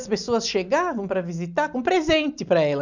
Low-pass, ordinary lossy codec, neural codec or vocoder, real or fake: 7.2 kHz; none; none; real